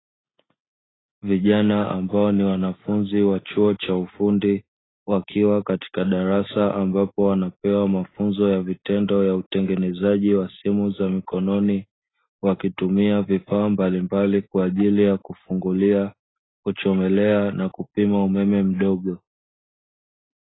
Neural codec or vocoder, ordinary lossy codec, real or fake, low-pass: none; AAC, 16 kbps; real; 7.2 kHz